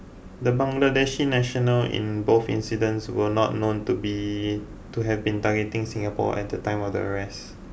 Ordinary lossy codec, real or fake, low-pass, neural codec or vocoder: none; real; none; none